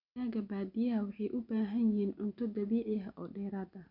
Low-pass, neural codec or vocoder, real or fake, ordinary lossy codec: 5.4 kHz; none; real; none